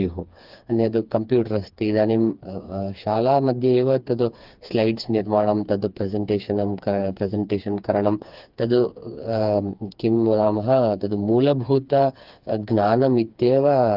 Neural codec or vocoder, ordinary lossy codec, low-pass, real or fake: codec, 16 kHz, 4 kbps, FreqCodec, smaller model; Opus, 32 kbps; 5.4 kHz; fake